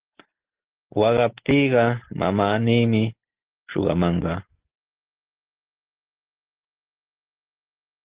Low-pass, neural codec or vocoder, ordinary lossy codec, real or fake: 3.6 kHz; none; Opus, 16 kbps; real